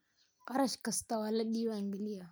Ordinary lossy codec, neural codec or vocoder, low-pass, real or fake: none; none; none; real